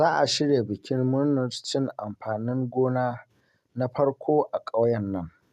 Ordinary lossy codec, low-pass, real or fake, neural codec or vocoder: none; 14.4 kHz; real; none